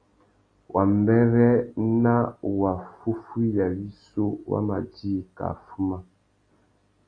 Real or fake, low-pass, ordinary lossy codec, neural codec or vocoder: real; 9.9 kHz; AAC, 32 kbps; none